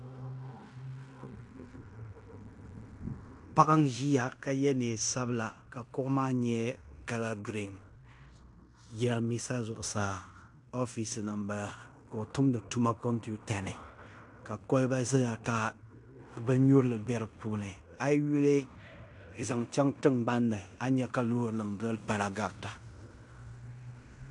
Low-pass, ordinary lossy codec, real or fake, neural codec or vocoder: 10.8 kHz; AAC, 64 kbps; fake; codec, 16 kHz in and 24 kHz out, 0.9 kbps, LongCat-Audio-Codec, fine tuned four codebook decoder